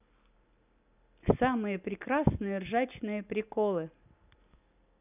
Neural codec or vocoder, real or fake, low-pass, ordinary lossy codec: none; real; 3.6 kHz; none